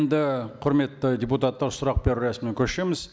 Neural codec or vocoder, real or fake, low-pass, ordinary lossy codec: none; real; none; none